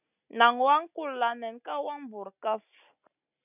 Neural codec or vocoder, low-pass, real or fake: none; 3.6 kHz; real